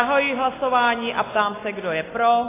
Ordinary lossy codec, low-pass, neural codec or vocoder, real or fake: AAC, 16 kbps; 3.6 kHz; none; real